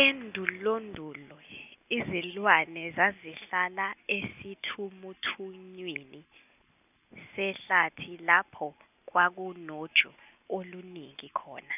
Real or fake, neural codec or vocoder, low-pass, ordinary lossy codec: real; none; 3.6 kHz; none